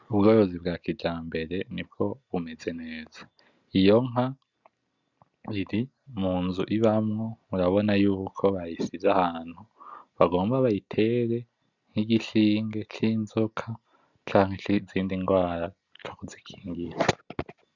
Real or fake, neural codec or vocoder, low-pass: real; none; 7.2 kHz